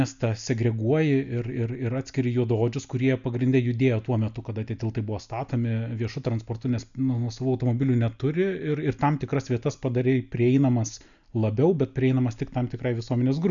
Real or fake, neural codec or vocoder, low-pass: real; none; 7.2 kHz